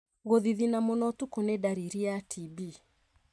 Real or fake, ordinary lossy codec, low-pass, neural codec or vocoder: real; none; none; none